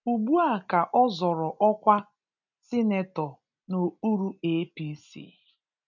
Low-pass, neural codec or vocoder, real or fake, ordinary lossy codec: 7.2 kHz; none; real; none